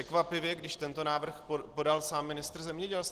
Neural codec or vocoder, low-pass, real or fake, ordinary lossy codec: none; 14.4 kHz; real; Opus, 16 kbps